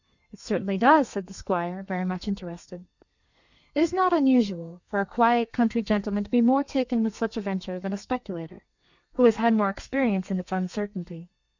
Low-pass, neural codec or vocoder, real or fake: 7.2 kHz; codec, 32 kHz, 1.9 kbps, SNAC; fake